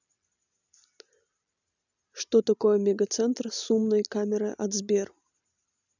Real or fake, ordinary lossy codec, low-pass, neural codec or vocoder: real; none; 7.2 kHz; none